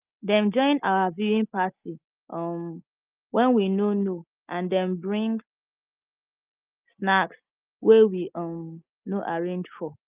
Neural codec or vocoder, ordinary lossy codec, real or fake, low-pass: none; Opus, 24 kbps; real; 3.6 kHz